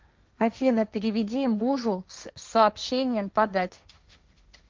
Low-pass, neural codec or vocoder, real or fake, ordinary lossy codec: 7.2 kHz; codec, 16 kHz, 1.1 kbps, Voila-Tokenizer; fake; Opus, 24 kbps